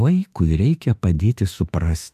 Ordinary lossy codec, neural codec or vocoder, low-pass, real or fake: AAC, 96 kbps; autoencoder, 48 kHz, 32 numbers a frame, DAC-VAE, trained on Japanese speech; 14.4 kHz; fake